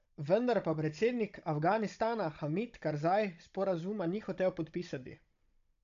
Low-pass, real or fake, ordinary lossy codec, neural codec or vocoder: 7.2 kHz; fake; MP3, 64 kbps; codec, 16 kHz, 16 kbps, FunCodec, trained on Chinese and English, 50 frames a second